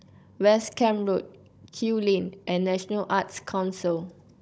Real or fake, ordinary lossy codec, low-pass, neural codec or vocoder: fake; none; none; codec, 16 kHz, 16 kbps, FunCodec, trained on Chinese and English, 50 frames a second